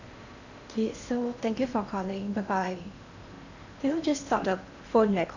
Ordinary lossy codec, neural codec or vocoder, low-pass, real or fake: none; codec, 16 kHz in and 24 kHz out, 0.8 kbps, FocalCodec, streaming, 65536 codes; 7.2 kHz; fake